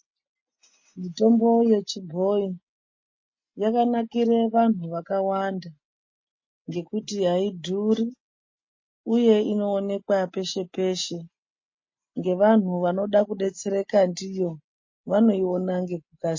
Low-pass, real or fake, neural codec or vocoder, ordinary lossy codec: 7.2 kHz; real; none; MP3, 32 kbps